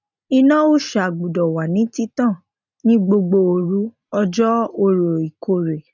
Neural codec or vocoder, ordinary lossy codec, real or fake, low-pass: none; none; real; 7.2 kHz